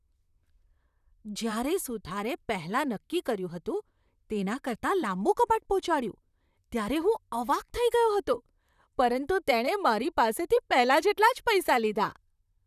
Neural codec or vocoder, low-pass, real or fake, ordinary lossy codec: vocoder, 44.1 kHz, 128 mel bands, Pupu-Vocoder; 14.4 kHz; fake; none